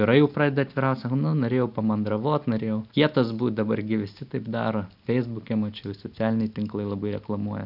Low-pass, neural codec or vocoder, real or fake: 5.4 kHz; none; real